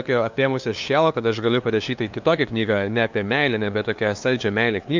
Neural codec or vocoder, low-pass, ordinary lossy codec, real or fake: codec, 16 kHz, 2 kbps, FunCodec, trained on LibriTTS, 25 frames a second; 7.2 kHz; MP3, 64 kbps; fake